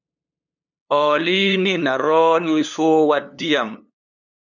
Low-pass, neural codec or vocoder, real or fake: 7.2 kHz; codec, 16 kHz, 2 kbps, FunCodec, trained on LibriTTS, 25 frames a second; fake